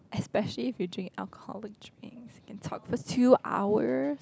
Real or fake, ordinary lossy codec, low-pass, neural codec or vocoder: real; none; none; none